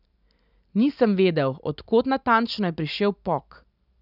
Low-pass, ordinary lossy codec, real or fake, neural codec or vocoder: 5.4 kHz; none; real; none